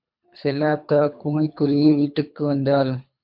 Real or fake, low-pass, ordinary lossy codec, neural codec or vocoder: fake; 5.4 kHz; MP3, 48 kbps; codec, 24 kHz, 3 kbps, HILCodec